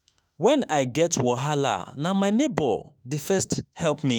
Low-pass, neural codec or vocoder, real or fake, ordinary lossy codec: none; autoencoder, 48 kHz, 32 numbers a frame, DAC-VAE, trained on Japanese speech; fake; none